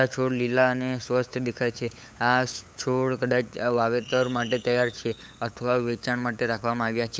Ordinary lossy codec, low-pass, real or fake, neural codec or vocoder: none; none; fake; codec, 16 kHz, 8 kbps, FunCodec, trained on LibriTTS, 25 frames a second